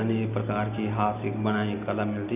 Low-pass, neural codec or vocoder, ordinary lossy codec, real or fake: 3.6 kHz; none; none; real